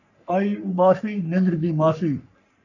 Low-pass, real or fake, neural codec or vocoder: 7.2 kHz; fake; codec, 44.1 kHz, 3.4 kbps, Pupu-Codec